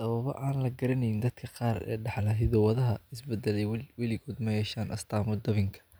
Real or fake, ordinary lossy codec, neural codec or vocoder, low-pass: real; none; none; none